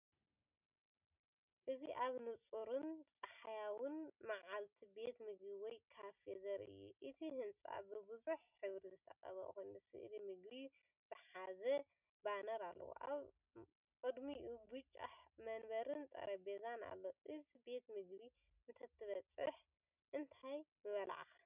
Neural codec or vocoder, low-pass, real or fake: none; 3.6 kHz; real